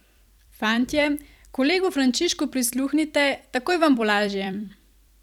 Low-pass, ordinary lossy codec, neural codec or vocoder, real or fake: 19.8 kHz; none; vocoder, 44.1 kHz, 128 mel bands every 256 samples, BigVGAN v2; fake